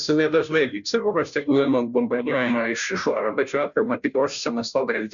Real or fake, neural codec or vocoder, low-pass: fake; codec, 16 kHz, 0.5 kbps, FunCodec, trained on Chinese and English, 25 frames a second; 7.2 kHz